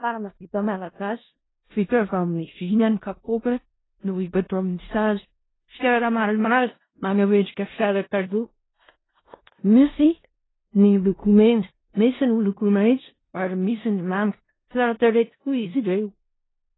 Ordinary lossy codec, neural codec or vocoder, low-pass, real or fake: AAC, 16 kbps; codec, 16 kHz in and 24 kHz out, 0.4 kbps, LongCat-Audio-Codec, four codebook decoder; 7.2 kHz; fake